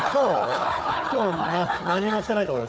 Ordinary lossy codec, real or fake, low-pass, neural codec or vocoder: none; fake; none; codec, 16 kHz, 4 kbps, FunCodec, trained on Chinese and English, 50 frames a second